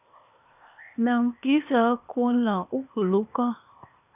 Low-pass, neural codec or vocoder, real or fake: 3.6 kHz; codec, 16 kHz, 0.8 kbps, ZipCodec; fake